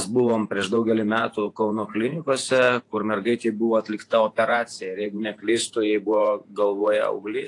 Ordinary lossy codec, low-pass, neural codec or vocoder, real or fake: AAC, 48 kbps; 10.8 kHz; vocoder, 48 kHz, 128 mel bands, Vocos; fake